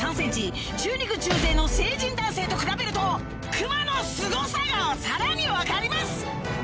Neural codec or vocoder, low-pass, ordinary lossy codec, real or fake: none; none; none; real